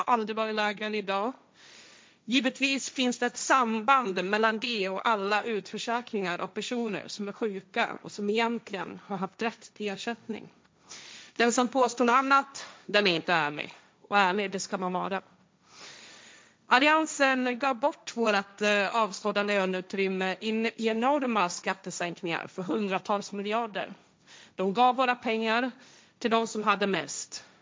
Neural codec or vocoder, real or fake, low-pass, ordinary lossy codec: codec, 16 kHz, 1.1 kbps, Voila-Tokenizer; fake; none; none